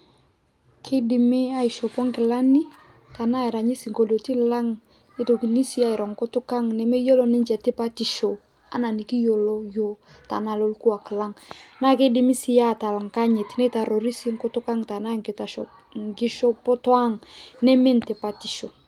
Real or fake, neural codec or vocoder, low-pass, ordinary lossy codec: real; none; 19.8 kHz; Opus, 32 kbps